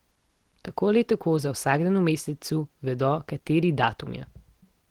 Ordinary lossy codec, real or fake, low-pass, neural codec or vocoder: Opus, 16 kbps; real; 19.8 kHz; none